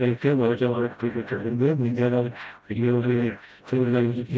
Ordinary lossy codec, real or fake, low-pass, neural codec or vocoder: none; fake; none; codec, 16 kHz, 0.5 kbps, FreqCodec, smaller model